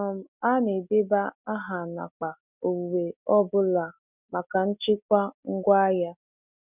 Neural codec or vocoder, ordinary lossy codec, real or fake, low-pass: none; none; real; 3.6 kHz